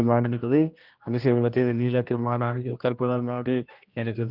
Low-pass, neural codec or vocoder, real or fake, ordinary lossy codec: 5.4 kHz; codec, 16 kHz, 1 kbps, X-Codec, HuBERT features, trained on general audio; fake; Opus, 24 kbps